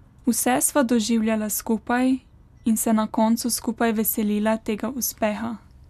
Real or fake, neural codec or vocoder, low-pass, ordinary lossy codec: real; none; 14.4 kHz; none